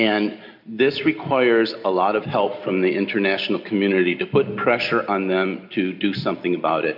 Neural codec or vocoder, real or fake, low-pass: none; real; 5.4 kHz